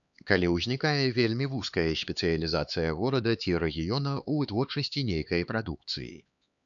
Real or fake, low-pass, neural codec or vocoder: fake; 7.2 kHz; codec, 16 kHz, 4 kbps, X-Codec, HuBERT features, trained on LibriSpeech